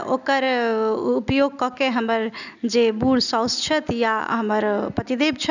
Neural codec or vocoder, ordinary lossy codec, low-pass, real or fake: none; none; 7.2 kHz; real